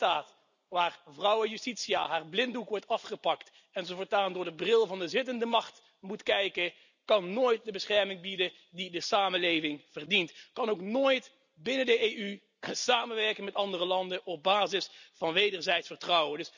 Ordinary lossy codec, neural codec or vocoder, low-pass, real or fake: none; none; 7.2 kHz; real